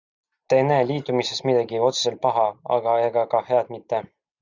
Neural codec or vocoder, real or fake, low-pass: none; real; 7.2 kHz